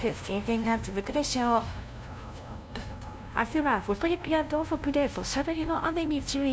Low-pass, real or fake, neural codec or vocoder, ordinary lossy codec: none; fake; codec, 16 kHz, 0.5 kbps, FunCodec, trained on LibriTTS, 25 frames a second; none